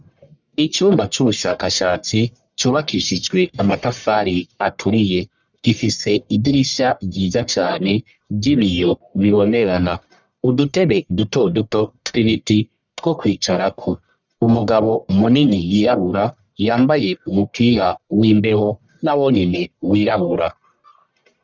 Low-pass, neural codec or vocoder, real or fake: 7.2 kHz; codec, 44.1 kHz, 1.7 kbps, Pupu-Codec; fake